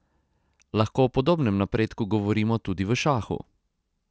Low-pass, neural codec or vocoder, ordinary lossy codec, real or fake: none; none; none; real